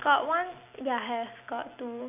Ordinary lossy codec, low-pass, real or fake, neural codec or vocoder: none; 3.6 kHz; real; none